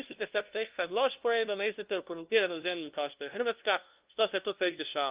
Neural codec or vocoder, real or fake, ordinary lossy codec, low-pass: codec, 16 kHz, 0.5 kbps, FunCodec, trained on LibriTTS, 25 frames a second; fake; Opus, 32 kbps; 3.6 kHz